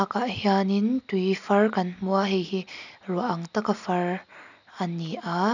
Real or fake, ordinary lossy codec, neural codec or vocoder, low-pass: real; none; none; 7.2 kHz